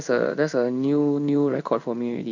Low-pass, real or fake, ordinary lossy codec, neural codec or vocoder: 7.2 kHz; fake; none; codec, 16 kHz in and 24 kHz out, 1 kbps, XY-Tokenizer